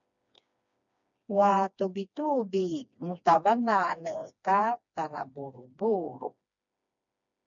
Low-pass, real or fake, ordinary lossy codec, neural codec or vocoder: 7.2 kHz; fake; MP3, 64 kbps; codec, 16 kHz, 2 kbps, FreqCodec, smaller model